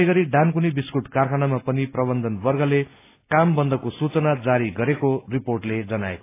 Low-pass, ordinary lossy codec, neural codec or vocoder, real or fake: 3.6 kHz; AAC, 24 kbps; none; real